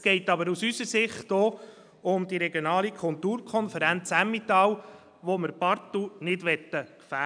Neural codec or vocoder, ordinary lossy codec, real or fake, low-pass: none; none; real; 9.9 kHz